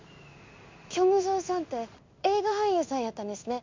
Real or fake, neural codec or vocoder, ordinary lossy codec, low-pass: fake; codec, 16 kHz in and 24 kHz out, 1 kbps, XY-Tokenizer; MP3, 64 kbps; 7.2 kHz